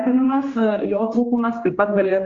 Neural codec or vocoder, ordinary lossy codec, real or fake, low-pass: codec, 16 kHz, 1 kbps, X-Codec, HuBERT features, trained on general audio; Opus, 32 kbps; fake; 7.2 kHz